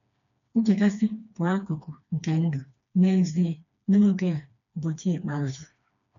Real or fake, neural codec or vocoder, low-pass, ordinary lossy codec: fake; codec, 16 kHz, 2 kbps, FreqCodec, smaller model; 7.2 kHz; MP3, 96 kbps